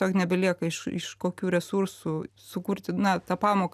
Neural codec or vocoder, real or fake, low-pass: none; real; 14.4 kHz